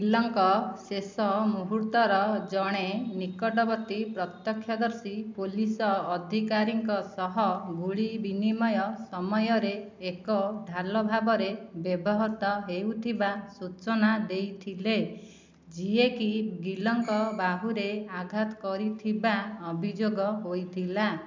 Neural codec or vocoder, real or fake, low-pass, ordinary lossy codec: none; real; 7.2 kHz; MP3, 64 kbps